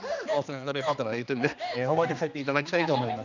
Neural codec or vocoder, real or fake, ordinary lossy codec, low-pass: codec, 16 kHz, 2 kbps, X-Codec, HuBERT features, trained on general audio; fake; none; 7.2 kHz